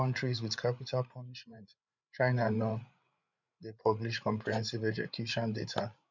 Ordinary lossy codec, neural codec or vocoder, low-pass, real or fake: none; codec, 16 kHz, 8 kbps, FreqCodec, larger model; 7.2 kHz; fake